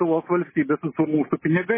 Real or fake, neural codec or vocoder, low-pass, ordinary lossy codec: real; none; 3.6 kHz; MP3, 16 kbps